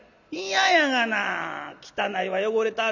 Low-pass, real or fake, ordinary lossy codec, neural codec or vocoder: 7.2 kHz; real; none; none